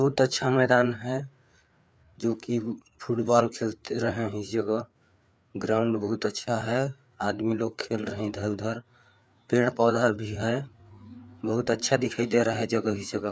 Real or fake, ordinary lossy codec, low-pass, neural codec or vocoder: fake; none; none; codec, 16 kHz, 4 kbps, FreqCodec, larger model